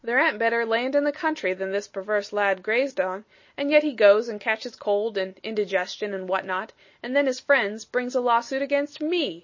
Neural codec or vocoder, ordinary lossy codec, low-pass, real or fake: none; MP3, 32 kbps; 7.2 kHz; real